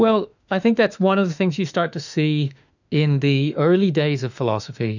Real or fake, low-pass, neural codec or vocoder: fake; 7.2 kHz; autoencoder, 48 kHz, 32 numbers a frame, DAC-VAE, trained on Japanese speech